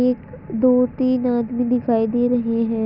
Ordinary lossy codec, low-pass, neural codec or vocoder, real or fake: none; 5.4 kHz; none; real